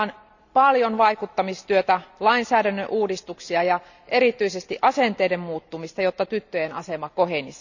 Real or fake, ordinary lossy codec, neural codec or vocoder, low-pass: real; none; none; 7.2 kHz